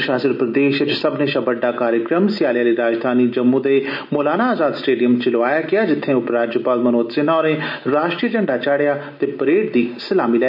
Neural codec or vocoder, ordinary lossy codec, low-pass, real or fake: none; none; 5.4 kHz; real